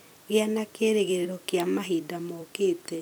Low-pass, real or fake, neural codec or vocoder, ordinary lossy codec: none; fake; vocoder, 44.1 kHz, 128 mel bands every 256 samples, BigVGAN v2; none